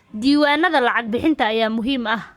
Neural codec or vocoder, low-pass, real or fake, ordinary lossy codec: codec, 44.1 kHz, 7.8 kbps, Pupu-Codec; 19.8 kHz; fake; Opus, 64 kbps